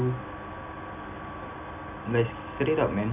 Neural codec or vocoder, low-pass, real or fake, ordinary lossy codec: none; 3.6 kHz; real; none